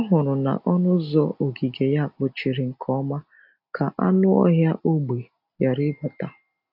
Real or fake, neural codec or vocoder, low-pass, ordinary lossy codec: real; none; 5.4 kHz; AAC, 48 kbps